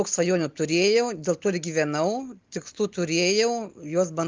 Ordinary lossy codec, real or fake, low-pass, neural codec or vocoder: Opus, 24 kbps; real; 7.2 kHz; none